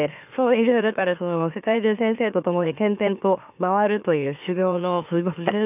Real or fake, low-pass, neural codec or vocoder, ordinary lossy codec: fake; 3.6 kHz; autoencoder, 44.1 kHz, a latent of 192 numbers a frame, MeloTTS; none